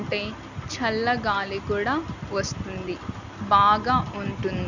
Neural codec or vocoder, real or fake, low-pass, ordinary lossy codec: none; real; 7.2 kHz; none